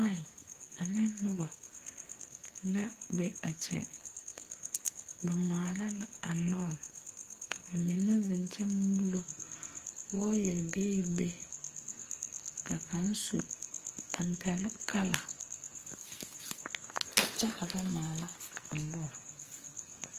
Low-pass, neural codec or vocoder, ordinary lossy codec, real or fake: 14.4 kHz; codec, 44.1 kHz, 2.6 kbps, SNAC; Opus, 24 kbps; fake